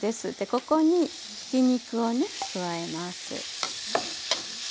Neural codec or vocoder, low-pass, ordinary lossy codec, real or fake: none; none; none; real